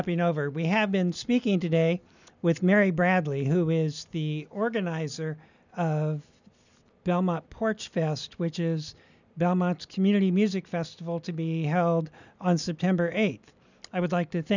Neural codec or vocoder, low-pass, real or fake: none; 7.2 kHz; real